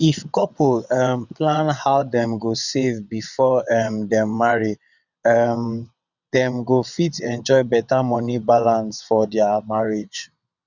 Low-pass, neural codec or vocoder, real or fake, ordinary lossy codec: 7.2 kHz; vocoder, 22.05 kHz, 80 mel bands, WaveNeXt; fake; none